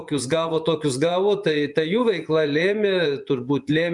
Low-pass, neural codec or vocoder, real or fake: 10.8 kHz; vocoder, 24 kHz, 100 mel bands, Vocos; fake